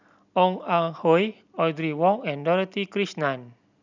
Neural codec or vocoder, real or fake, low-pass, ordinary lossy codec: none; real; 7.2 kHz; none